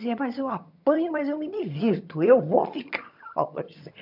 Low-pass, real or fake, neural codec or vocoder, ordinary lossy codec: 5.4 kHz; fake; vocoder, 22.05 kHz, 80 mel bands, HiFi-GAN; none